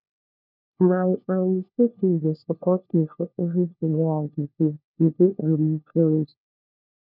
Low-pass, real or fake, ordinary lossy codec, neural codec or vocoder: 5.4 kHz; fake; none; codec, 16 kHz, 1 kbps, FunCodec, trained on LibriTTS, 50 frames a second